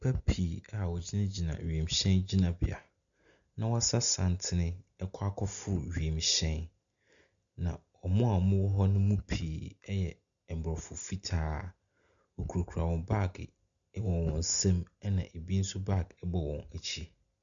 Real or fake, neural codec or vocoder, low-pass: real; none; 7.2 kHz